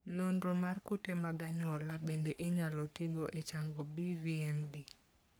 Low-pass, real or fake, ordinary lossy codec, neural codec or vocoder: none; fake; none; codec, 44.1 kHz, 3.4 kbps, Pupu-Codec